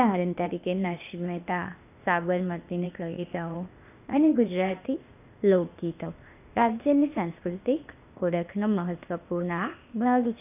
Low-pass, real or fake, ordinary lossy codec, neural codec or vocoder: 3.6 kHz; fake; none; codec, 16 kHz, 0.8 kbps, ZipCodec